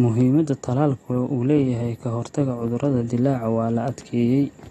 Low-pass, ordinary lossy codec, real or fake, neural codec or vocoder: 19.8 kHz; AAC, 32 kbps; real; none